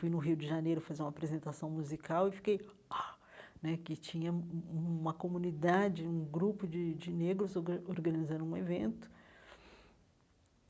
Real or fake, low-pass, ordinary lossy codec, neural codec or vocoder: real; none; none; none